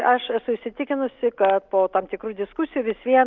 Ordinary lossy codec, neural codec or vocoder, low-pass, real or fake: Opus, 32 kbps; none; 7.2 kHz; real